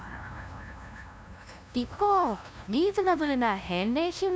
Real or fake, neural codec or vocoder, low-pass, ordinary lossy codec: fake; codec, 16 kHz, 0.5 kbps, FunCodec, trained on LibriTTS, 25 frames a second; none; none